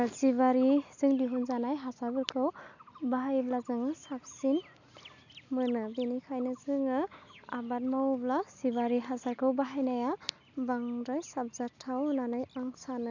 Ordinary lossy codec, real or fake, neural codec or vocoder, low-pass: none; real; none; 7.2 kHz